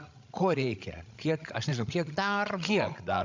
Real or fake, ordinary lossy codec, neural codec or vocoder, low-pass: fake; MP3, 64 kbps; codec, 16 kHz, 16 kbps, FreqCodec, larger model; 7.2 kHz